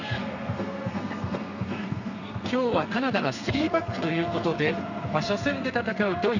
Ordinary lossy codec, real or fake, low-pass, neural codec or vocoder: none; fake; 7.2 kHz; codec, 32 kHz, 1.9 kbps, SNAC